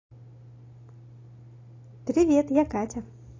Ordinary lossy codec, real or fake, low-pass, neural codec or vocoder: AAC, 32 kbps; real; 7.2 kHz; none